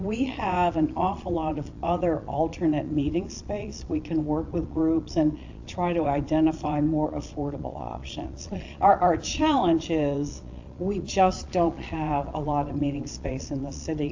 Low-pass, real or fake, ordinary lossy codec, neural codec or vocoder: 7.2 kHz; fake; AAC, 48 kbps; vocoder, 22.05 kHz, 80 mel bands, Vocos